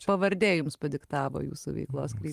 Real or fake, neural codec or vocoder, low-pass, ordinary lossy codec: fake; vocoder, 44.1 kHz, 128 mel bands every 512 samples, BigVGAN v2; 14.4 kHz; Opus, 32 kbps